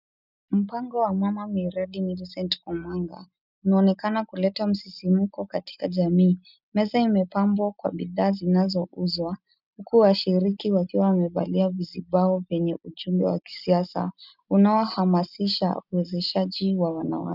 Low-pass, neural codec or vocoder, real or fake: 5.4 kHz; none; real